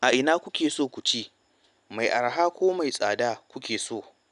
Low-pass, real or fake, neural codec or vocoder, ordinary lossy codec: 10.8 kHz; real; none; none